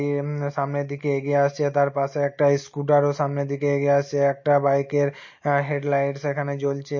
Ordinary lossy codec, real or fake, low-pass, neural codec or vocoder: MP3, 32 kbps; real; 7.2 kHz; none